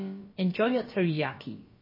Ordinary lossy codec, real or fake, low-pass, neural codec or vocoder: MP3, 24 kbps; fake; 5.4 kHz; codec, 16 kHz, about 1 kbps, DyCAST, with the encoder's durations